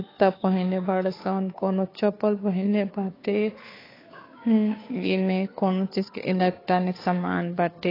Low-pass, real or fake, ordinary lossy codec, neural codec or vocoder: 5.4 kHz; fake; AAC, 24 kbps; codec, 16 kHz, 2 kbps, FunCodec, trained on Chinese and English, 25 frames a second